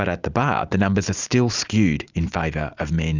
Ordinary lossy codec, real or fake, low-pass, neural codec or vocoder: Opus, 64 kbps; real; 7.2 kHz; none